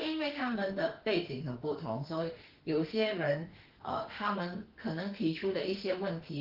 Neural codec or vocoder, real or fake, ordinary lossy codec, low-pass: autoencoder, 48 kHz, 32 numbers a frame, DAC-VAE, trained on Japanese speech; fake; Opus, 16 kbps; 5.4 kHz